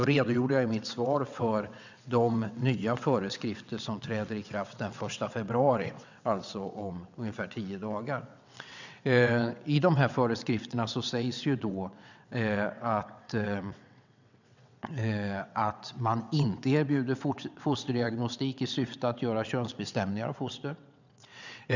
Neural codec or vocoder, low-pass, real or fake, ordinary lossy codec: vocoder, 22.05 kHz, 80 mel bands, WaveNeXt; 7.2 kHz; fake; none